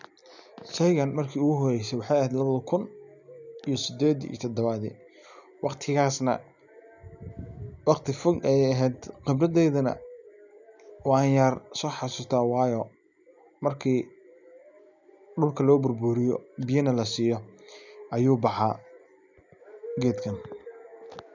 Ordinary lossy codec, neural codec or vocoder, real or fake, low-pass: none; none; real; 7.2 kHz